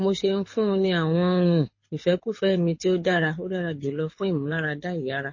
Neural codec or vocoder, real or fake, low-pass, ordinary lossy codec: codec, 24 kHz, 6 kbps, HILCodec; fake; 7.2 kHz; MP3, 32 kbps